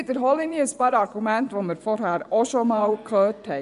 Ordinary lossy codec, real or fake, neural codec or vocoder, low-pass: none; fake; vocoder, 24 kHz, 100 mel bands, Vocos; 10.8 kHz